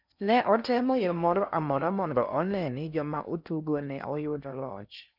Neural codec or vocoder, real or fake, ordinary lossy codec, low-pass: codec, 16 kHz in and 24 kHz out, 0.6 kbps, FocalCodec, streaming, 4096 codes; fake; none; 5.4 kHz